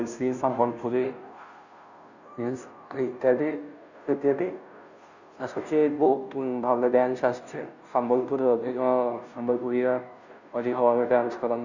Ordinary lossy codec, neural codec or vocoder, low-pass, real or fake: none; codec, 16 kHz, 0.5 kbps, FunCodec, trained on Chinese and English, 25 frames a second; 7.2 kHz; fake